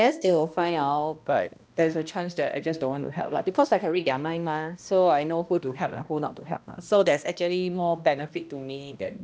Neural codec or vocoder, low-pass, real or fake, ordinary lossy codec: codec, 16 kHz, 1 kbps, X-Codec, HuBERT features, trained on balanced general audio; none; fake; none